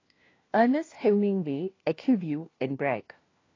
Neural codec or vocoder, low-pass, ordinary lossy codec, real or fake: codec, 16 kHz, 1 kbps, FunCodec, trained on LibriTTS, 50 frames a second; 7.2 kHz; AAC, 32 kbps; fake